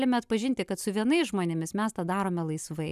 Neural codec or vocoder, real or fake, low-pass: none; real; 14.4 kHz